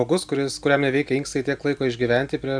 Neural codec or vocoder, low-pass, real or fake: none; 9.9 kHz; real